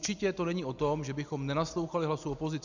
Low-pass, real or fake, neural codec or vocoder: 7.2 kHz; real; none